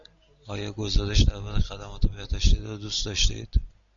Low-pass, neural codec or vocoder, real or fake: 7.2 kHz; none; real